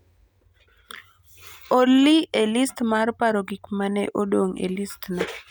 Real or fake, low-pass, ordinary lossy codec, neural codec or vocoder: real; none; none; none